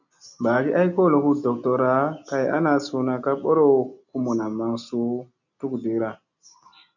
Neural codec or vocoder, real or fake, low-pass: none; real; 7.2 kHz